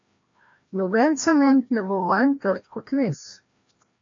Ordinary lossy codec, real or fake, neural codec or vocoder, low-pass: MP3, 64 kbps; fake; codec, 16 kHz, 1 kbps, FreqCodec, larger model; 7.2 kHz